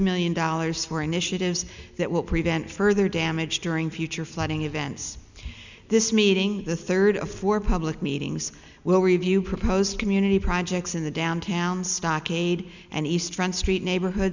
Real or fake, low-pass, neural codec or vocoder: real; 7.2 kHz; none